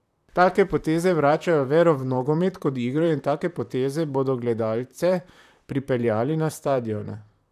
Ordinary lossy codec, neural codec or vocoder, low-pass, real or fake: none; vocoder, 44.1 kHz, 128 mel bands, Pupu-Vocoder; 14.4 kHz; fake